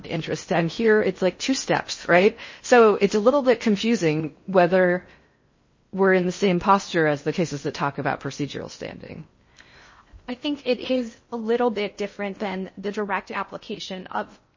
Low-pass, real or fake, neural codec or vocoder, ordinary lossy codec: 7.2 kHz; fake; codec, 16 kHz in and 24 kHz out, 0.6 kbps, FocalCodec, streaming, 4096 codes; MP3, 32 kbps